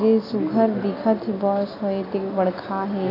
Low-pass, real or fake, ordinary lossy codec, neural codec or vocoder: 5.4 kHz; real; AAC, 24 kbps; none